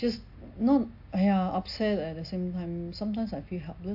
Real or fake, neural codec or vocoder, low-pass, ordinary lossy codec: real; none; 5.4 kHz; AAC, 48 kbps